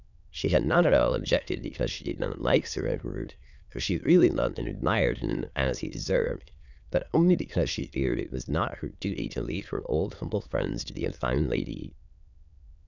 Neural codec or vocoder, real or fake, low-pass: autoencoder, 22.05 kHz, a latent of 192 numbers a frame, VITS, trained on many speakers; fake; 7.2 kHz